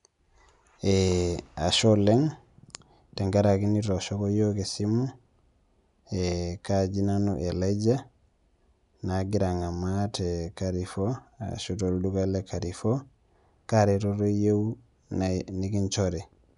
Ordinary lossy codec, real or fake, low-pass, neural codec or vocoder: none; real; 10.8 kHz; none